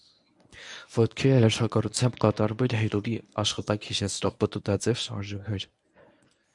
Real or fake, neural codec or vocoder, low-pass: fake; codec, 24 kHz, 0.9 kbps, WavTokenizer, medium speech release version 1; 10.8 kHz